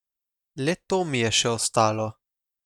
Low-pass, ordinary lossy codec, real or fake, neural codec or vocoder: 19.8 kHz; none; real; none